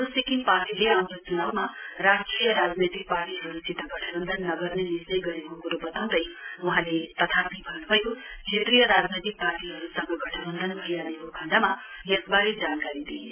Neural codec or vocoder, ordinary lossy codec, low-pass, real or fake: none; MP3, 32 kbps; 3.6 kHz; real